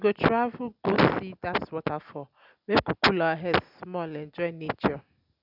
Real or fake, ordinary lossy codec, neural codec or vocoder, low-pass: real; none; none; 5.4 kHz